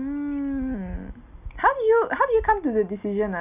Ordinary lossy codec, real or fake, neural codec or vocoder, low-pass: none; real; none; 3.6 kHz